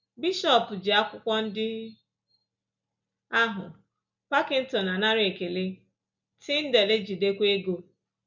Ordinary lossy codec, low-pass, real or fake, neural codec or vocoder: none; 7.2 kHz; real; none